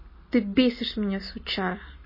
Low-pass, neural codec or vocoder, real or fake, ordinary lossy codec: 5.4 kHz; none; real; MP3, 24 kbps